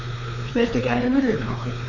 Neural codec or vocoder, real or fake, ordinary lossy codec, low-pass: codec, 16 kHz, 4 kbps, X-Codec, WavLM features, trained on Multilingual LibriSpeech; fake; AAC, 48 kbps; 7.2 kHz